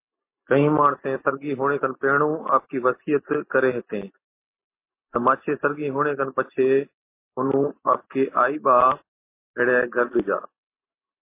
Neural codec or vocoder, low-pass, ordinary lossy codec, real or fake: none; 3.6 kHz; MP3, 24 kbps; real